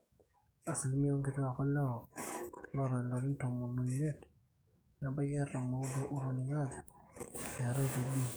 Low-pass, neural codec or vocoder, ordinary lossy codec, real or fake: none; codec, 44.1 kHz, 7.8 kbps, DAC; none; fake